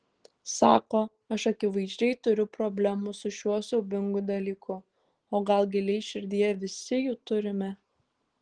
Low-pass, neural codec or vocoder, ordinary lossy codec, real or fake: 9.9 kHz; none; Opus, 16 kbps; real